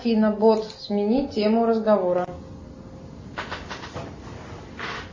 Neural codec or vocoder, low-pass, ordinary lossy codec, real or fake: none; 7.2 kHz; MP3, 32 kbps; real